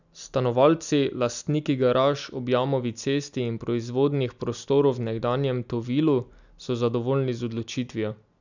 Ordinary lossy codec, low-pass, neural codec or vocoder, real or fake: none; 7.2 kHz; none; real